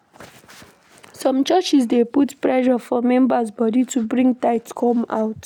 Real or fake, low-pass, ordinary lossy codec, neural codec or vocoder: real; 19.8 kHz; none; none